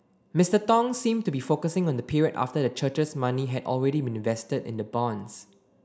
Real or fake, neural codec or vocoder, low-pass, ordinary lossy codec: real; none; none; none